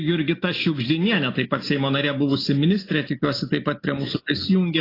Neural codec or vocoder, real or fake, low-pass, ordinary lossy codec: none; real; 5.4 kHz; AAC, 24 kbps